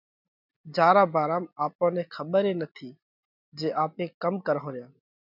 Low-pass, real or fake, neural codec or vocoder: 5.4 kHz; real; none